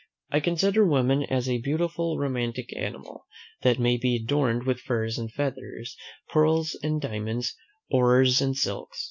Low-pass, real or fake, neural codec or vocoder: 7.2 kHz; real; none